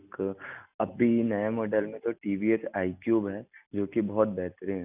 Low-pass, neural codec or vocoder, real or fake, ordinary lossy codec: 3.6 kHz; none; real; none